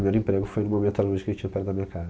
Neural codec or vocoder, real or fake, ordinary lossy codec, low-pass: none; real; none; none